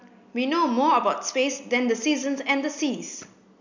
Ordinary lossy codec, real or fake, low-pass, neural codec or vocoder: none; real; 7.2 kHz; none